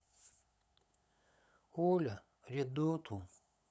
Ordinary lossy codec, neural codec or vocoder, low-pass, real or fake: none; codec, 16 kHz, 8 kbps, FunCodec, trained on LibriTTS, 25 frames a second; none; fake